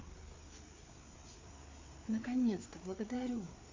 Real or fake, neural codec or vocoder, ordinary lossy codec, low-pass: fake; codec, 16 kHz, 4 kbps, FreqCodec, larger model; MP3, 64 kbps; 7.2 kHz